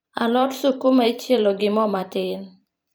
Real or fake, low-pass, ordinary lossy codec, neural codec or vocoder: fake; none; none; vocoder, 44.1 kHz, 128 mel bands every 512 samples, BigVGAN v2